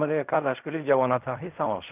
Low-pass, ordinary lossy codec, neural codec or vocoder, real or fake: 3.6 kHz; none; codec, 16 kHz in and 24 kHz out, 0.4 kbps, LongCat-Audio-Codec, fine tuned four codebook decoder; fake